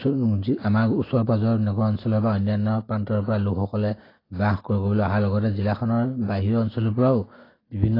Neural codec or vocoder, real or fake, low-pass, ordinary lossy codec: none; real; 5.4 kHz; AAC, 24 kbps